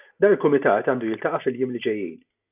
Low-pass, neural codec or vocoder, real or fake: 3.6 kHz; none; real